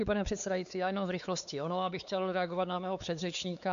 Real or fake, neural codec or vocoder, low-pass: fake; codec, 16 kHz, 4 kbps, X-Codec, WavLM features, trained on Multilingual LibriSpeech; 7.2 kHz